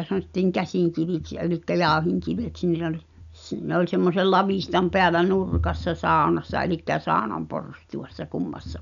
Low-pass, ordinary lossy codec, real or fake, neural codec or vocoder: 7.2 kHz; none; real; none